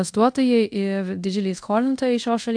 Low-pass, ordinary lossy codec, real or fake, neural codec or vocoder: 9.9 kHz; AAC, 64 kbps; fake; codec, 24 kHz, 0.5 kbps, DualCodec